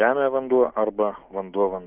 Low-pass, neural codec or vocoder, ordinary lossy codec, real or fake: 3.6 kHz; none; Opus, 32 kbps; real